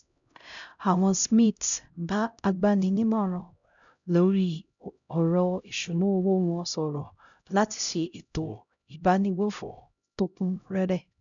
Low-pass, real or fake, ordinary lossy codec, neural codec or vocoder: 7.2 kHz; fake; none; codec, 16 kHz, 0.5 kbps, X-Codec, HuBERT features, trained on LibriSpeech